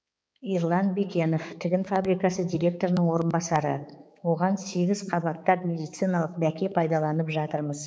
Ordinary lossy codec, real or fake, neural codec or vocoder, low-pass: none; fake; codec, 16 kHz, 4 kbps, X-Codec, HuBERT features, trained on balanced general audio; none